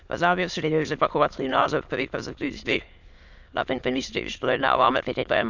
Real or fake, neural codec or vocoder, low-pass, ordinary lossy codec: fake; autoencoder, 22.05 kHz, a latent of 192 numbers a frame, VITS, trained on many speakers; 7.2 kHz; none